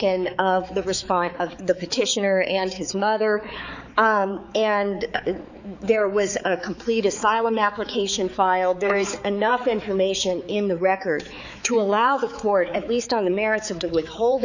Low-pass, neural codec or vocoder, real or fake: 7.2 kHz; codec, 16 kHz, 4 kbps, X-Codec, HuBERT features, trained on balanced general audio; fake